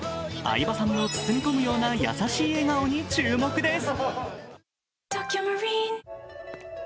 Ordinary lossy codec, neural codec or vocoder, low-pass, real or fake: none; none; none; real